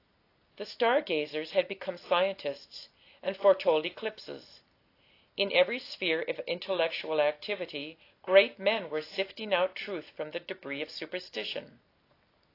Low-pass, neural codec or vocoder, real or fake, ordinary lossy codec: 5.4 kHz; none; real; AAC, 32 kbps